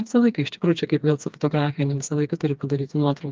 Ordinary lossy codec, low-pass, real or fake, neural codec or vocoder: Opus, 32 kbps; 7.2 kHz; fake; codec, 16 kHz, 2 kbps, FreqCodec, smaller model